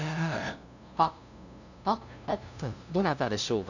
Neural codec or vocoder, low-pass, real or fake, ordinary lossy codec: codec, 16 kHz, 0.5 kbps, FunCodec, trained on LibriTTS, 25 frames a second; 7.2 kHz; fake; none